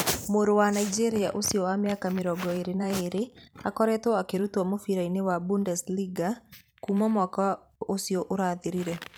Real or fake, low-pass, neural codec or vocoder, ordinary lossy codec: fake; none; vocoder, 44.1 kHz, 128 mel bands every 512 samples, BigVGAN v2; none